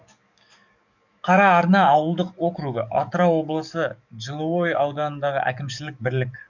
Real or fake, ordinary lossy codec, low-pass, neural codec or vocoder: fake; none; 7.2 kHz; codec, 44.1 kHz, 7.8 kbps, DAC